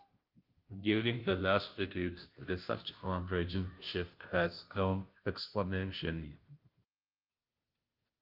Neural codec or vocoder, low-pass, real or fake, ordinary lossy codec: codec, 16 kHz, 0.5 kbps, FunCodec, trained on Chinese and English, 25 frames a second; 5.4 kHz; fake; Opus, 24 kbps